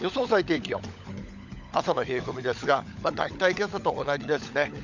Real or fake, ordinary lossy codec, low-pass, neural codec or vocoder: fake; none; 7.2 kHz; codec, 16 kHz, 16 kbps, FunCodec, trained on LibriTTS, 50 frames a second